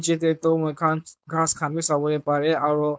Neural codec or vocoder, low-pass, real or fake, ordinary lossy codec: codec, 16 kHz, 4.8 kbps, FACodec; none; fake; none